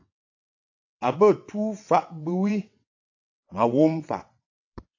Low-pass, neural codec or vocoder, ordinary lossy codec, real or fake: 7.2 kHz; vocoder, 22.05 kHz, 80 mel bands, WaveNeXt; AAC, 48 kbps; fake